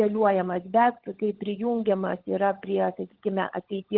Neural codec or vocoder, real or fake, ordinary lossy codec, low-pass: codec, 16 kHz, 16 kbps, FunCodec, trained on LibriTTS, 50 frames a second; fake; Opus, 16 kbps; 5.4 kHz